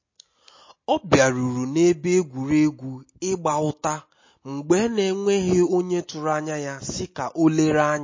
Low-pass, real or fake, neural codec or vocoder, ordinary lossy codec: 7.2 kHz; real; none; MP3, 32 kbps